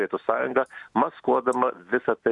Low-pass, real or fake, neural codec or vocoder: 10.8 kHz; real; none